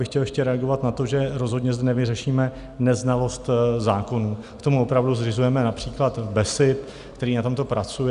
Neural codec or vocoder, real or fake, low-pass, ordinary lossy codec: none; real; 10.8 kHz; Opus, 64 kbps